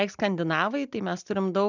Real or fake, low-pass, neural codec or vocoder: real; 7.2 kHz; none